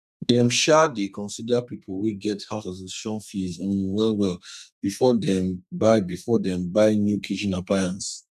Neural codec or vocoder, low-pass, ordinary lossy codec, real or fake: codec, 32 kHz, 1.9 kbps, SNAC; 14.4 kHz; none; fake